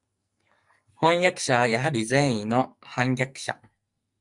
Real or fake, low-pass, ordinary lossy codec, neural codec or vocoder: fake; 10.8 kHz; Opus, 64 kbps; codec, 44.1 kHz, 2.6 kbps, SNAC